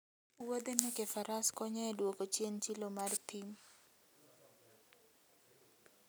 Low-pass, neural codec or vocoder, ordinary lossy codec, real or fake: none; none; none; real